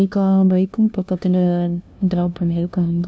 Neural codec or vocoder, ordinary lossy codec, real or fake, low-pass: codec, 16 kHz, 0.5 kbps, FunCodec, trained on LibriTTS, 25 frames a second; none; fake; none